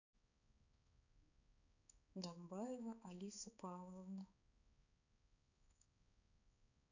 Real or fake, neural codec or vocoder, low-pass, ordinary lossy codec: fake; codec, 16 kHz, 4 kbps, X-Codec, HuBERT features, trained on balanced general audio; 7.2 kHz; none